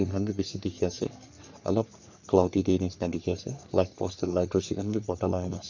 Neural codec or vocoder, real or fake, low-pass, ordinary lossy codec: codec, 44.1 kHz, 3.4 kbps, Pupu-Codec; fake; 7.2 kHz; none